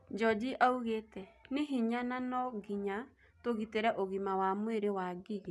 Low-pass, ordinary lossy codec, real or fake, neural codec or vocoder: none; none; real; none